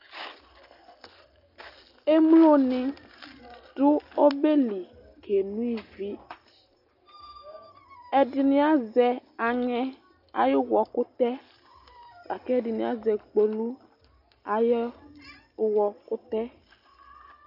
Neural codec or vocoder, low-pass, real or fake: none; 5.4 kHz; real